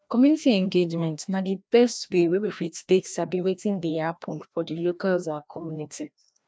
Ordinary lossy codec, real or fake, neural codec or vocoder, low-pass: none; fake; codec, 16 kHz, 1 kbps, FreqCodec, larger model; none